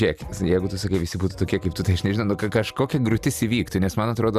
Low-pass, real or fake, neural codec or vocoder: 14.4 kHz; real; none